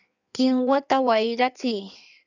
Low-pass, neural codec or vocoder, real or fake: 7.2 kHz; codec, 16 kHz in and 24 kHz out, 1.1 kbps, FireRedTTS-2 codec; fake